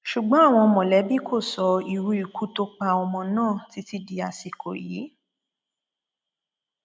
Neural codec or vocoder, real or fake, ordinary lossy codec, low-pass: none; real; none; none